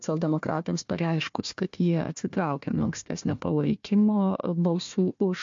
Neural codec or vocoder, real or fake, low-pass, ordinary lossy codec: codec, 16 kHz, 1 kbps, FunCodec, trained on Chinese and English, 50 frames a second; fake; 7.2 kHz; MP3, 48 kbps